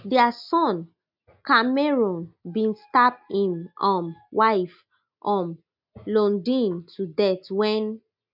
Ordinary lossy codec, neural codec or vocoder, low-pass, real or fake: none; none; 5.4 kHz; real